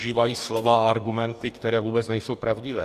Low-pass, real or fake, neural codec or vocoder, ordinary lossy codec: 14.4 kHz; fake; codec, 44.1 kHz, 2.6 kbps, DAC; Opus, 64 kbps